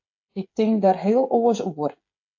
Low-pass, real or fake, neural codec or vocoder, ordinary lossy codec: 7.2 kHz; fake; codec, 16 kHz, 16 kbps, FreqCodec, smaller model; AAC, 48 kbps